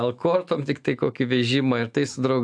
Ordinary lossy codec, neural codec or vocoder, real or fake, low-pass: AAC, 64 kbps; autoencoder, 48 kHz, 128 numbers a frame, DAC-VAE, trained on Japanese speech; fake; 9.9 kHz